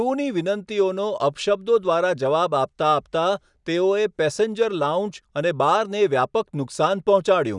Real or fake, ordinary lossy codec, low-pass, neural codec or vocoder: real; none; 10.8 kHz; none